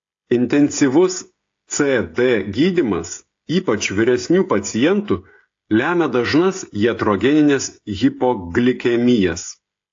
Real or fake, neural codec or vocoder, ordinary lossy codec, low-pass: fake; codec, 16 kHz, 16 kbps, FreqCodec, smaller model; AAC, 48 kbps; 7.2 kHz